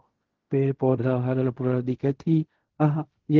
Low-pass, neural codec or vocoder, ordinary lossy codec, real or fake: 7.2 kHz; codec, 16 kHz in and 24 kHz out, 0.4 kbps, LongCat-Audio-Codec, fine tuned four codebook decoder; Opus, 16 kbps; fake